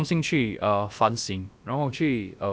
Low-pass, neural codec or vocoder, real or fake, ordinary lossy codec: none; codec, 16 kHz, about 1 kbps, DyCAST, with the encoder's durations; fake; none